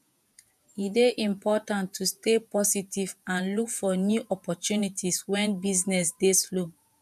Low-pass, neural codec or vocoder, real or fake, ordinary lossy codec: 14.4 kHz; vocoder, 48 kHz, 128 mel bands, Vocos; fake; none